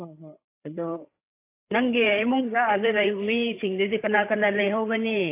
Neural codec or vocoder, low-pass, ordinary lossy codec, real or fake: codec, 16 kHz, 8 kbps, FreqCodec, larger model; 3.6 kHz; AAC, 24 kbps; fake